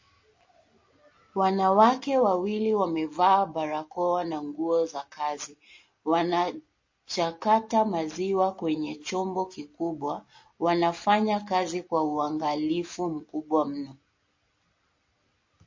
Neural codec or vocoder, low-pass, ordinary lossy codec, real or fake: none; 7.2 kHz; MP3, 32 kbps; real